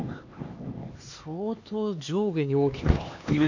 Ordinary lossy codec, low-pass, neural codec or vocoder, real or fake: none; 7.2 kHz; codec, 16 kHz, 2 kbps, X-Codec, HuBERT features, trained on LibriSpeech; fake